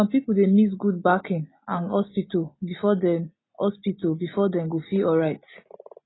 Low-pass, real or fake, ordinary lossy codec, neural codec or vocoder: 7.2 kHz; real; AAC, 16 kbps; none